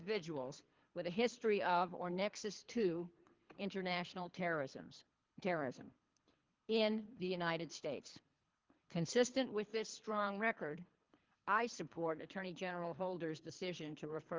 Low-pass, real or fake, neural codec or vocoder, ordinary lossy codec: 7.2 kHz; fake; codec, 24 kHz, 3 kbps, HILCodec; Opus, 32 kbps